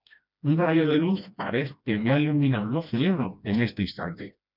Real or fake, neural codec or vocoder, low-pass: fake; codec, 16 kHz, 1 kbps, FreqCodec, smaller model; 5.4 kHz